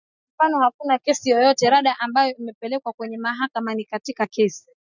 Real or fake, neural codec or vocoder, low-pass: real; none; 7.2 kHz